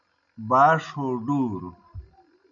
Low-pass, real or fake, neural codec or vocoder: 7.2 kHz; real; none